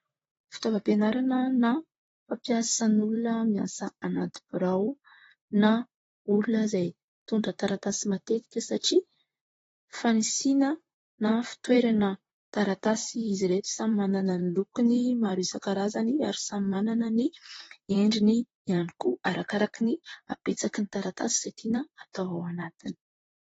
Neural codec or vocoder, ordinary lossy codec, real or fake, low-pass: vocoder, 44.1 kHz, 128 mel bands, Pupu-Vocoder; AAC, 24 kbps; fake; 19.8 kHz